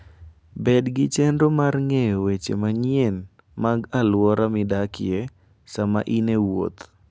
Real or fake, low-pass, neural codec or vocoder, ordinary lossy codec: real; none; none; none